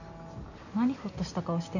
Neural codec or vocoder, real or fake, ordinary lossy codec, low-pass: none; real; none; 7.2 kHz